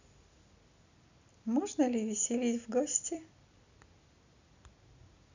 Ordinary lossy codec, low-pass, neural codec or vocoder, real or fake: none; 7.2 kHz; none; real